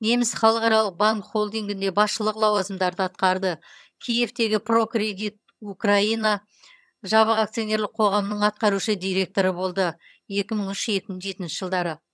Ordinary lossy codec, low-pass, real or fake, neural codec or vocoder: none; none; fake; vocoder, 22.05 kHz, 80 mel bands, HiFi-GAN